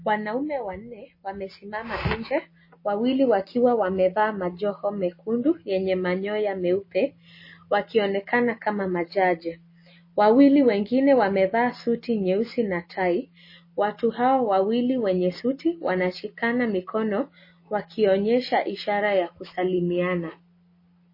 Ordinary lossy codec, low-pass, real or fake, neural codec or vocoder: MP3, 24 kbps; 5.4 kHz; real; none